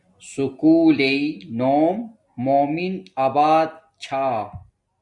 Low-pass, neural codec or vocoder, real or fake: 10.8 kHz; none; real